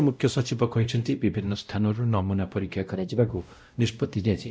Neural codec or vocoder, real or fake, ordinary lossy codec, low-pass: codec, 16 kHz, 0.5 kbps, X-Codec, WavLM features, trained on Multilingual LibriSpeech; fake; none; none